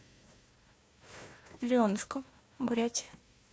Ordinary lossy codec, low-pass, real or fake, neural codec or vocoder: none; none; fake; codec, 16 kHz, 1 kbps, FunCodec, trained on Chinese and English, 50 frames a second